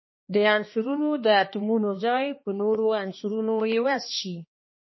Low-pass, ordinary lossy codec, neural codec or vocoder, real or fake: 7.2 kHz; MP3, 24 kbps; codec, 16 kHz, 2 kbps, X-Codec, HuBERT features, trained on balanced general audio; fake